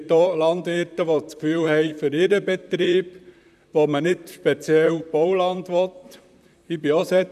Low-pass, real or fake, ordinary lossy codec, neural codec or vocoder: 14.4 kHz; fake; none; vocoder, 44.1 kHz, 128 mel bands, Pupu-Vocoder